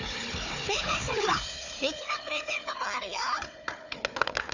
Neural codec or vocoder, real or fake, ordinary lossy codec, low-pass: codec, 16 kHz, 4 kbps, FunCodec, trained on Chinese and English, 50 frames a second; fake; none; 7.2 kHz